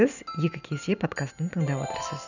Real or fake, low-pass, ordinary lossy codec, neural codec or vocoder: real; 7.2 kHz; none; none